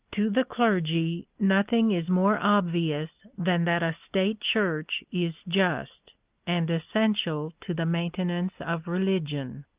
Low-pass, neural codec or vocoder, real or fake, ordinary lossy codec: 3.6 kHz; none; real; Opus, 32 kbps